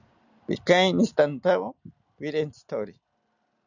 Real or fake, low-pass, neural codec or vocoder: real; 7.2 kHz; none